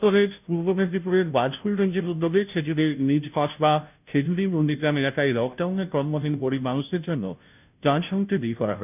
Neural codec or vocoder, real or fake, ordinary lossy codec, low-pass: codec, 16 kHz, 0.5 kbps, FunCodec, trained on Chinese and English, 25 frames a second; fake; none; 3.6 kHz